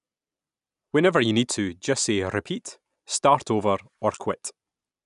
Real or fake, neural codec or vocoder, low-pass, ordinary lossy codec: real; none; 10.8 kHz; none